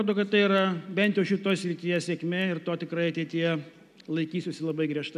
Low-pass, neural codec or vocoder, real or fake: 14.4 kHz; none; real